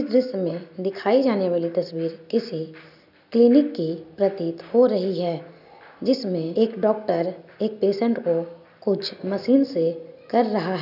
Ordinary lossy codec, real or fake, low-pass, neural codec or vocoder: none; real; 5.4 kHz; none